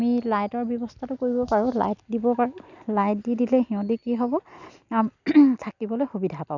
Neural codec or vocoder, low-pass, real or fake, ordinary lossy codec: none; 7.2 kHz; real; none